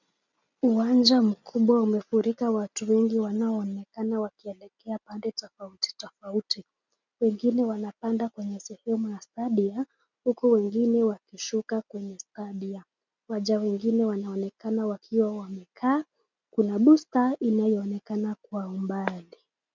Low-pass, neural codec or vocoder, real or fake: 7.2 kHz; none; real